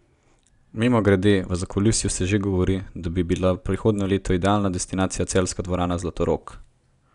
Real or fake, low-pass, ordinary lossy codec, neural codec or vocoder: real; 10.8 kHz; none; none